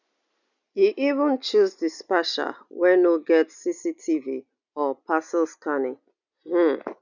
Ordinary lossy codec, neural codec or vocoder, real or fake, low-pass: none; none; real; 7.2 kHz